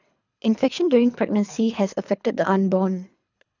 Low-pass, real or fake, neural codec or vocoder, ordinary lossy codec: 7.2 kHz; fake; codec, 24 kHz, 3 kbps, HILCodec; none